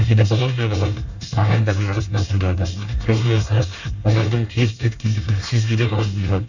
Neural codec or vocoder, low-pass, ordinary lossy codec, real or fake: codec, 24 kHz, 1 kbps, SNAC; 7.2 kHz; none; fake